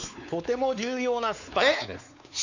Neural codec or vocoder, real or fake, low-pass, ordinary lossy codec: codec, 16 kHz, 4 kbps, X-Codec, WavLM features, trained on Multilingual LibriSpeech; fake; 7.2 kHz; none